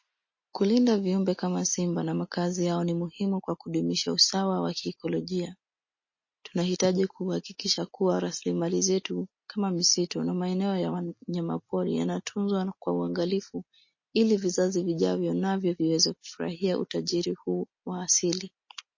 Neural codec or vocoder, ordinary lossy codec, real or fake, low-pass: none; MP3, 32 kbps; real; 7.2 kHz